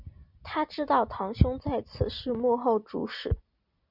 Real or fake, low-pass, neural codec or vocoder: real; 5.4 kHz; none